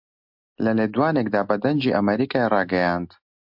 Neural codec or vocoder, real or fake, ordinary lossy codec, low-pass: none; real; MP3, 48 kbps; 5.4 kHz